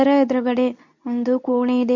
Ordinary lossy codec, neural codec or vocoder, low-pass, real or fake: none; codec, 24 kHz, 0.9 kbps, WavTokenizer, medium speech release version 2; 7.2 kHz; fake